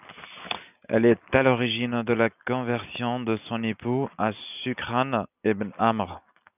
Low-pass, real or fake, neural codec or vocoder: 3.6 kHz; real; none